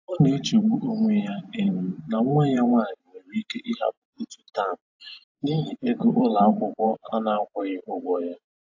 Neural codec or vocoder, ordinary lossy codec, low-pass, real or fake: vocoder, 44.1 kHz, 128 mel bands every 512 samples, BigVGAN v2; AAC, 48 kbps; 7.2 kHz; fake